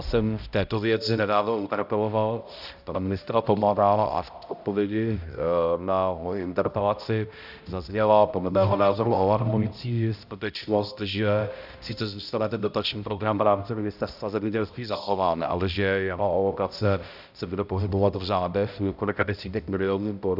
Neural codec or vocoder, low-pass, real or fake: codec, 16 kHz, 0.5 kbps, X-Codec, HuBERT features, trained on balanced general audio; 5.4 kHz; fake